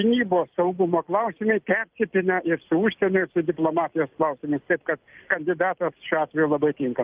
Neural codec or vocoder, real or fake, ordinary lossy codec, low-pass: none; real; Opus, 32 kbps; 3.6 kHz